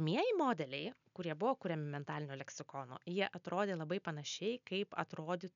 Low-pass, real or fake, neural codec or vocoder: 7.2 kHz; real; none